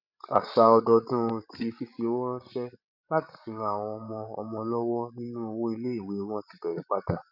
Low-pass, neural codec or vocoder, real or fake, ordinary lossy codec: 5.4 kHz; codec, 16 kHz, 16 kbps, FreqCodec, larger model; fake; none